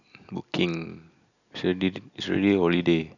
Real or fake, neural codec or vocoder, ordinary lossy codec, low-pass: real; none; none; 7.2 kHz